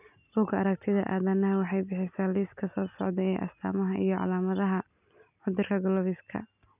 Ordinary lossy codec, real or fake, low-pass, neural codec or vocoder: none; real; 3.6 kHz; none